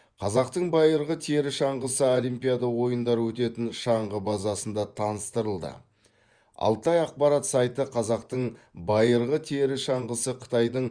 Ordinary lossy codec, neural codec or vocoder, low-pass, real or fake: Opus, 64 kbps; vocoder, 24 kHz, 100 mel bands, Vocos; 9.9 kHz; fake